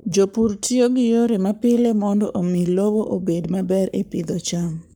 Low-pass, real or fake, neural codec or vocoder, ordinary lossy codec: none; fake; codec, 44.1 kHz, 7.8 kbps, Pupu-Codec; none